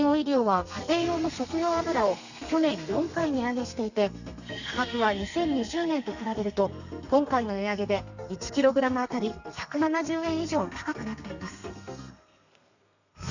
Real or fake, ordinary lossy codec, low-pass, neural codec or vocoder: fake; none; 7.2 kHz; codec, 32 kHz, 1.9 kbps, SNAC